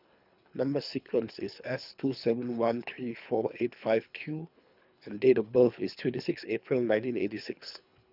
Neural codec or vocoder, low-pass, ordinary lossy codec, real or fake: codec, 24 kHz, 3 kbps, HILCodec; 5.4 kHz; none; fake